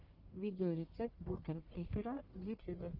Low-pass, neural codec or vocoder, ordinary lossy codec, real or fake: 5.4 kHz; codec, 44.1 kHz, 1.7 kbps, Pupu-Codec; Opus, 24 kbps; fake